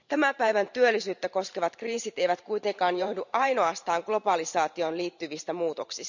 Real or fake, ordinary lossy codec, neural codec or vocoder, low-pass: fake; none; vocoder, 44.1 kHz, 128 mel bands every 512 samples, BigVGAN v2; 7.2 kHz